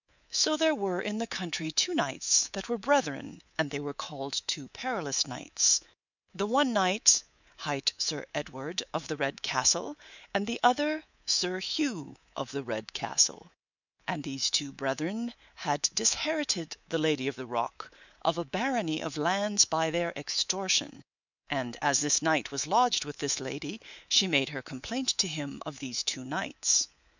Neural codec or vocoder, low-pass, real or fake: codec, 24 kHz, 3.1 kbps, DualCodec; 7.2 kHz; fake